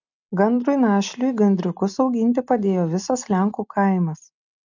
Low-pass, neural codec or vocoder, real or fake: 7.2 kHz; none; real